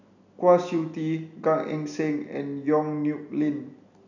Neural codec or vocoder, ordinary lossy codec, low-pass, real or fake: none; none; 7.2 kHz; real